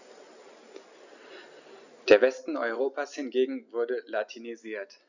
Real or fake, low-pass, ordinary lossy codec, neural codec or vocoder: real; 7.2 kHz; none; none